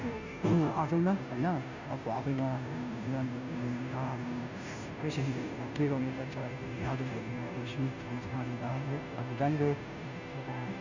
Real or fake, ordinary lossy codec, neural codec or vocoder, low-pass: fake; none; codec, 16 kHz, 0.5 kbps, FunCodec, trained on Chinese and English, 25 frames a second; 7.2 kHz